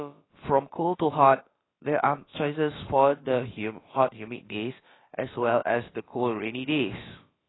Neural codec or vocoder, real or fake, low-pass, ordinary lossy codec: codec, 16 kHz, about 1 kbps, DyCAST, with the encoder's durations; fake; 7.2 kHz; AAC, 16 kbps